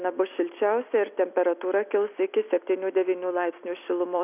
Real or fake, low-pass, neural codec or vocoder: real; 3.6 kHz; none